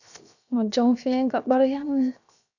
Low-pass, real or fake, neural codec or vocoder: 7.2 kHz; fake; codec, 16 kHz, 0.7 kbps, FocalCodec